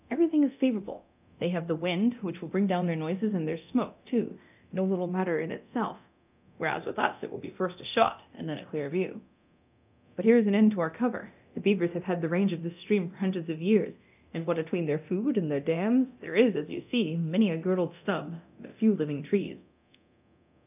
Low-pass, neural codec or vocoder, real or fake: 3.6 kHz; codec, 24 kHz, 0.9 kbps, DualCodec; fake